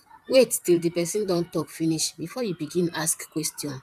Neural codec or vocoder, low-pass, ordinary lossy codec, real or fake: vocoder, 44.1 kHz, 128 mel bands, Pupu-Vocoder; 14.4 kHz; none; fake